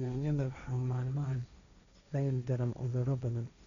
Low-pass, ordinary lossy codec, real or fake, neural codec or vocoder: 7.2 kHz; none; fake; codec, 16 kHz, 1.1 kbps, Voila-Tokenizer